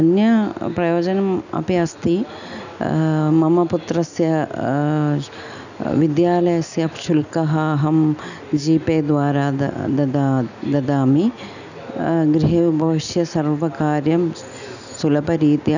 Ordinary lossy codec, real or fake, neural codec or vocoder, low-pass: none; real; none; 7.2 kHz